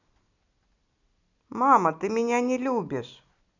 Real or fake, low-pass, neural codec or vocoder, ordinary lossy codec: real; 7.2 kHz; none; none